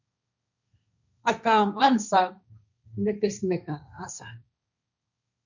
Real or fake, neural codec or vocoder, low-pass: fake; codec, 16 kHz, 1.1 kbps, Voila-Tokenizer; 7.2 kHz